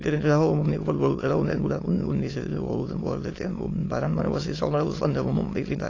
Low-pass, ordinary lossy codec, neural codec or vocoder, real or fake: 7.2 kHz; AAC, 32 kbps; autoencoder, 22.05 kHz, a latent of 192 numbers a frame, VITS, trained on many speakers; fake